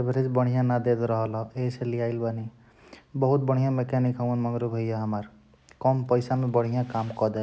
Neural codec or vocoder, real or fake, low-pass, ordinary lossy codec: none; real; none; none